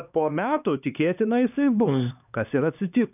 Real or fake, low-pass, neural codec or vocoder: fake; 3.6 kHz; codec, 16 kHz, 2 kbps, X-Codec, HuBERT features, trained on LibriSpeech